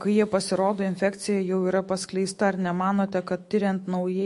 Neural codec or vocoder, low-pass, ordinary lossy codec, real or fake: autoencoder, 48 kHz, 128 numbers a frame, DAC-VAE, trained on Japanese speech; 14.4 kHz; MP3, 48 kbps; fake